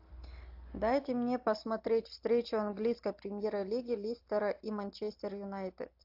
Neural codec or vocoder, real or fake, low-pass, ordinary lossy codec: none; real; 5.4 kHz; AAC, 48 kbps